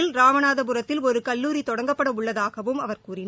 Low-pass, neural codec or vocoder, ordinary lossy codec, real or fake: none; none; none; real